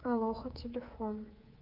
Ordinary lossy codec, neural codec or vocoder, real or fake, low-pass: Opus, 24 kbps; codec, 24 kHz, 3.1 kbps, DualCodec; fake; 5.4 kHz